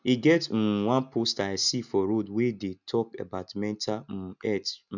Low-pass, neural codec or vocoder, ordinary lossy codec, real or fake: 7.2 kHz; none; none; real